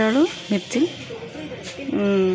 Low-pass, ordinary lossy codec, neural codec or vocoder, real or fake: none; none; none; real